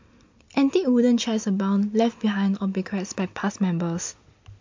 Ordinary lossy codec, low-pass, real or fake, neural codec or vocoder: MP3, 48 kbps; 7.2 kHz; real; none